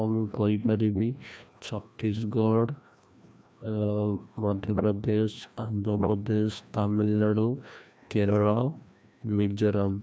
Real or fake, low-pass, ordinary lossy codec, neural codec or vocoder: fake; none; none; codec, 16 kHz, 1 kbps, FreqCodec, larger model